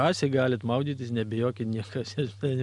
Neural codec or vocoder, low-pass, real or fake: none; 10.8 kHz; real